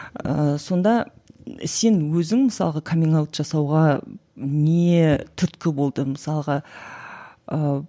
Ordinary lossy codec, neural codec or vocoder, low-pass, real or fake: none; none; none; real